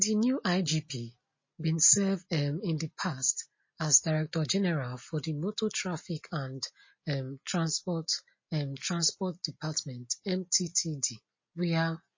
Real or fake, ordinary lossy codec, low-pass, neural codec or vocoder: real; MP3, 32 kbps; 7.2 kHz; none